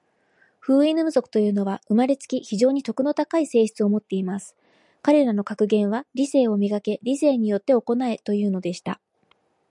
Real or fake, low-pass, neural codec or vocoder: real; 10.8 kHz; none